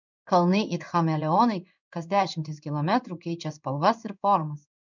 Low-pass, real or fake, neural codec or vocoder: 7.2 kHz; fake; codec, 16 kHz in and 24 kHz out, 1 kbps, XY-Tokenizer